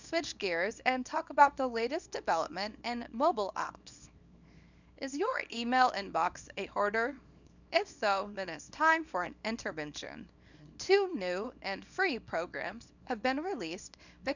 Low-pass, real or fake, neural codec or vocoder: 7.2 kHz; fake; codec, 24 kHz, 0.9 kbps, WavTokenizer, small release